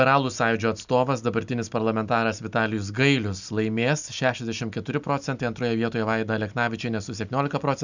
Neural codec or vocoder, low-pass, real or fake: none; 7.2 kHz; real